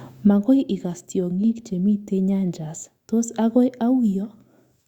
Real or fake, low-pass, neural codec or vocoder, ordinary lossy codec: fake; 19.8 kHz; autoencoder, 48 kHz, 128 numbers a frame, DAC-VAE, trained on Japanese speech; Opus, 64 kbps